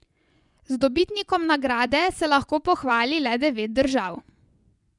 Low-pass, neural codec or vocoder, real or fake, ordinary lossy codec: 10.8 kHz; vocoder, 24 kHz, 100 mel bands, Vocos; fake; none